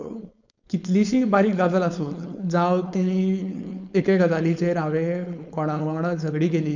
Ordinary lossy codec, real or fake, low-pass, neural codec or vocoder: none; fake; 7.2 kHz; codec, 16 kHz, 4.8 kbps, FACodec